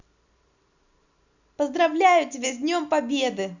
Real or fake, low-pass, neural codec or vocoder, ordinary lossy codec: real; 7.2 kHz; none; none